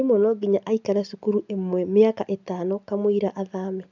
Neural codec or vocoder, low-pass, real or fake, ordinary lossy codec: none; 7.2 kHz; real; none